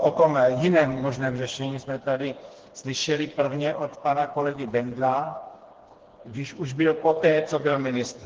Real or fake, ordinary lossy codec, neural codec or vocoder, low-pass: fake; Opus, 16 kbps; codec, 16 kHz, 2 kbps, FreqCodec, smaller model; 7.2 kHz